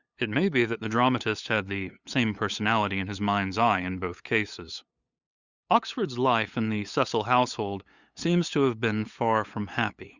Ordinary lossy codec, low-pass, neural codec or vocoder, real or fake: Opus, 64 kbps; 7.2 kHz; codec, 16 kHz, 8 kbps, FunCodec, trained on LibriTTS, 25 frames a second; fake